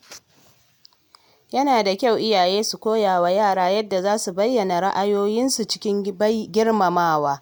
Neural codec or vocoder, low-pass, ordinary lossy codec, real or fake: none; none; none; real